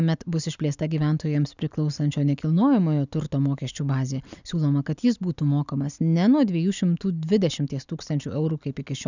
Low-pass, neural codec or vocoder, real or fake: 7.2 kHz; none; real